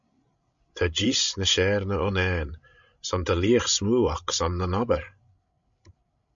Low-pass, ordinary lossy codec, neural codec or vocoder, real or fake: 7.2 kHz; MP3, 64 kbps; codec, 16 kHz, 16 kbps, FreqCodec, larger model; fake